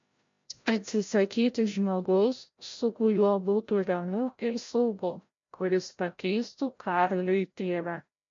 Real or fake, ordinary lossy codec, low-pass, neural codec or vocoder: fake; MP3, 48 kbps; 7.2 kHz; codec, 16 kHz, 0.5 kbps, FreqCodec, larger model